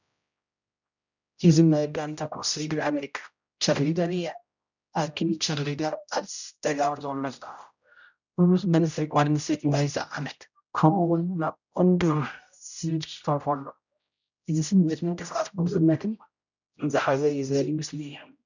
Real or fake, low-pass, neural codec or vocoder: fake; 7.2 kHz; codec, 16 kHz, 0.5 kbps, X-Codec, HuBERT features, trained on general audio